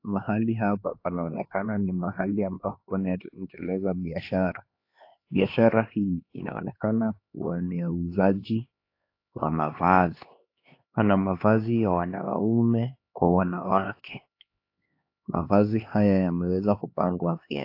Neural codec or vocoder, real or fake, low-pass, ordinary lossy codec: codec, 16 kHz, 2 kbps, X-Codec, HuBERT features, trained on LibriSpeech; fake; 5.4 kHz; AAC, 32 kbps